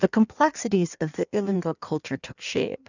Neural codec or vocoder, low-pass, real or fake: codec, 16 kHz in and 24 kHz out, 1.1 kbps, FireRedTTS-2 codec; 7.2 kHz; fake